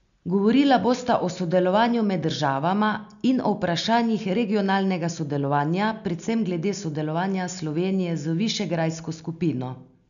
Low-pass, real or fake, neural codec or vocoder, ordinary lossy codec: 7.2 kHz; real; none; none